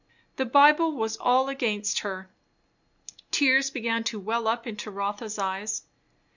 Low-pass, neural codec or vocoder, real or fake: 7.2 kHz; none; real